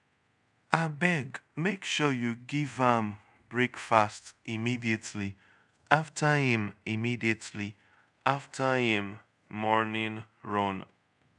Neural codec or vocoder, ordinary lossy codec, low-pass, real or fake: codec, 24 kHz, 0.5 kbps, DualCodec; none; 10.8 kHz; fake